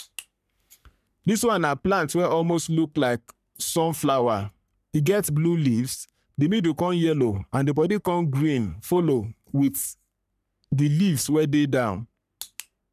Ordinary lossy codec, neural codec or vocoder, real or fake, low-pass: none; codec, 44.1 kHz, 3.4 kbps, Pupu-Codec; fake; 14.4 kHz